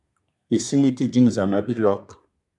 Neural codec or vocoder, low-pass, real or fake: codec, 24 kHz, 1 kbps, SNAC; 10.8 kHz; fake